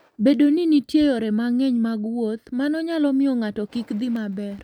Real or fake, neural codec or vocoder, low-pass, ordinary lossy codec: real; none; 19.8 kHz; none